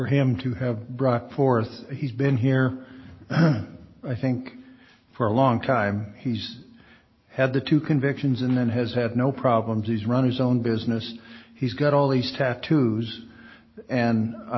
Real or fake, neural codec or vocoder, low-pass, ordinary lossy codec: real; none; 7.2 kHz; MP3, 24 kbps